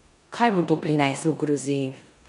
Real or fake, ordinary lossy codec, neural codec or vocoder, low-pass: fake; none; codec, 16 kHz in and 24 kHz out, 0.9 kbps, LongCat-Audio-Codec, four codebook decoder; 10.8 kHz